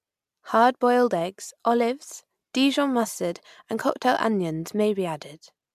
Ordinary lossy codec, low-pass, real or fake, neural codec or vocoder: MP3, 96 kbps; 14.4 kHz; real; none